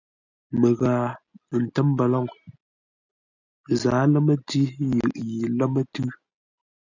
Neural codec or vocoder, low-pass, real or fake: none; 7.2 kHz; real